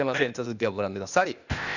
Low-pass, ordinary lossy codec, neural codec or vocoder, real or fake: 7.2 kHz; none; codec, 16 kHz, 0.8 kbps, ZipCodec; fake